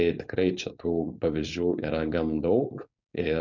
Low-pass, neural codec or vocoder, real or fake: 7.2 kHz; codec, 16 kHz, 4.8 kbps, FACodec; fake